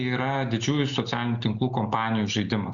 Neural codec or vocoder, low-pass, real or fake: none; 7.2 kHz; real